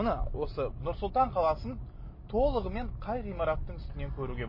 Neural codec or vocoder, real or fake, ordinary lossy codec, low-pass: none; real; MP3, 24 kbps; 5.4 kHz